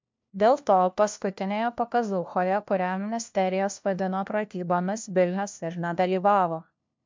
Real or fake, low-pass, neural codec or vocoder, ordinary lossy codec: fake; 7.2 kHz; codec, 16 kHz, 1 kbps, FunCodec, trained on LibriTTS, 50 frames a second; MP3, 64 kbps